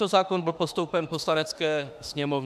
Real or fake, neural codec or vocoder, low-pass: fake; autoencoder, 48 kHz, 32 numbers a frame, DAC-VAE, trained on Japanese speech; 14.4 kHz